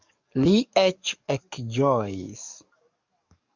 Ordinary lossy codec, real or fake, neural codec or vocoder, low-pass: Opus, 64 kbps; fake; codec, 44.1 kHz, 7.8 kbps, DAC; 7.2 kHz